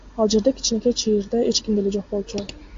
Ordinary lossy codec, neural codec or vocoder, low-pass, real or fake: AAC, 48 kbps; none; 7.2 kHz; real